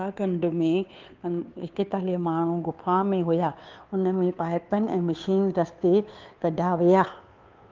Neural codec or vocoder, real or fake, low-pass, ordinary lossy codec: codec, 16 kHz, 2 kbps, FunCodec, trained on Chinese and English, 25 frames a second; fake; 7.2 kHz; Opus, 32 kbps